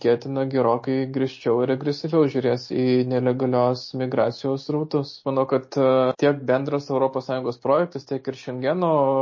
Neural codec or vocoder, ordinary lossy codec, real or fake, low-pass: none; MP3, 32 kbps; real; 7.2 kHz